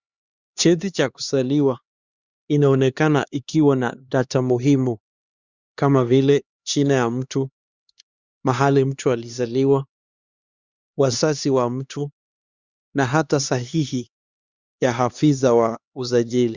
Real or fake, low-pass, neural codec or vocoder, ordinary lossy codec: fake; 7.2 kHz; codec, 16 kHz, 2 kbps, X-Codec, HuBERT features, trained on LibriSpeech; Opus, 64 kbps